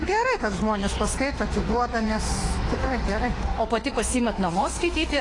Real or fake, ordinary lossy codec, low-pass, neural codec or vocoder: fake; AAC, 32 kbps; 10.8 kHz; autoencoder, 48 kHz, 32 numbers a frame, DAC-VAE, trained on Japanese speech